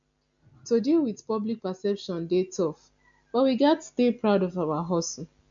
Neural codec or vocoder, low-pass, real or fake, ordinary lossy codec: none; 7.2 kHz; real; none